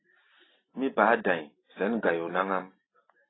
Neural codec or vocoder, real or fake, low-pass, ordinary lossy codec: none; real; 7.2 kHz; AAC, 16 kbps